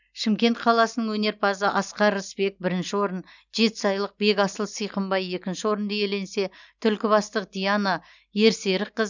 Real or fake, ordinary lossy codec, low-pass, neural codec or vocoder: real; none; 7.2 kHz; none